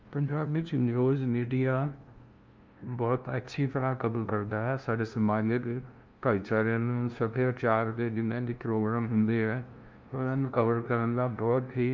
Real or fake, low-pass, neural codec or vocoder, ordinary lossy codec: fake; 7.2 kHz; codec, 16 kHz, 0.5 kbps, FunCodec, trained on LibriTTS, 25 frames a second; Opus, 24 kbps